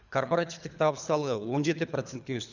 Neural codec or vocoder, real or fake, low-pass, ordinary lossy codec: codec, 24 kHz, 3 kbps, HILCodec; fake; 7.2 kHz; none